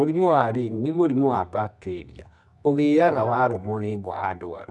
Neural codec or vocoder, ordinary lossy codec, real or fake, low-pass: codec, 24 kHz, 0.9 kbps, WavTokenizer, medium music audio release; none; fake; 10.8 kHz